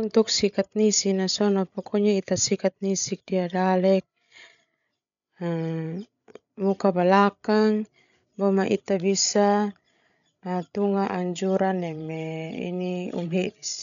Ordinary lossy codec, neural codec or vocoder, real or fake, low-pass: none; codec, 16 kHz, 8 kbps, FreqCodec, larger model; fake; 7.2 kHz